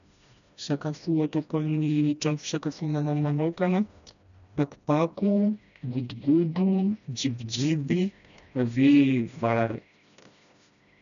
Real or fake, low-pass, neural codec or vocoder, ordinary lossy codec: fake; 7.2 kHz; codec, 16 kHz, 1 kbps, FreqCodec, smaller model; none